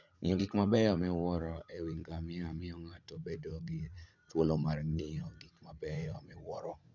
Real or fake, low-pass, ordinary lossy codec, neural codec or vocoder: fake; 7.2 kHz; none; vocoder, 44.1 kHz, 80 mel bands, Vocos